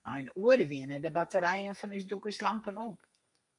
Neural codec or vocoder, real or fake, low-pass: codec, 32 kHz, 1.9 kbps, SNAC; fake; 10.8 kHz